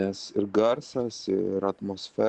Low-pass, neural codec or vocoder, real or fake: 10.8 kHz; vocoder, 44.1 kHz, 128 mel bands every 512 samples, BigVGAN v2; fake